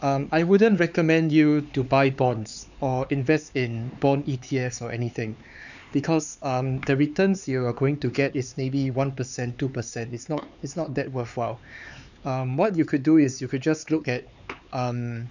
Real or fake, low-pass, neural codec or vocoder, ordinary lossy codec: fake; 7.2 kHz; codec, 16 kHz, 4 kbps, X-Codec, HuBERT features, trained on LibriSpeech; none